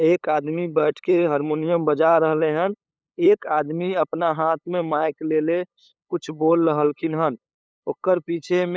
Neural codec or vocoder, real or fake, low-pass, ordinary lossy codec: codec, 16 kHz, 8 kbps, FunCodec, trained on LibriTTS, 25 frames a second; fake; none; none